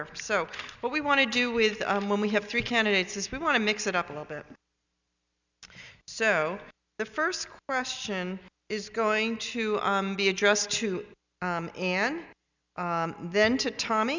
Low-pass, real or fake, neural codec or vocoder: 7.2 kHz; real; none